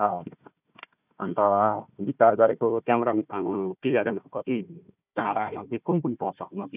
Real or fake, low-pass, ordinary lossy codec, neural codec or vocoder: fake; 3.6 kHz; none; codec, 16 kHz, 1 kbps, FunCodec, trained on Chinese and English, 50 frames a second